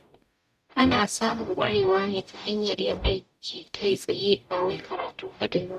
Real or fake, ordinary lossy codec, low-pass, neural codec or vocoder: fake; none; 14.4 kHz; codec, 44.1 kHz, 0.9 kbps, DAC